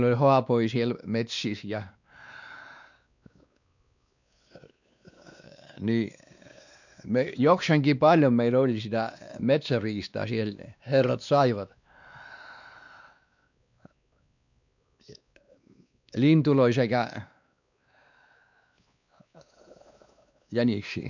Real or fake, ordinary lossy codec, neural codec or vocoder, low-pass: fake; none; codec, 16 kHz, 2 kbps, X-Codec, WavLM features, trained on Multilingual LibriSpeech; 7.2 kHz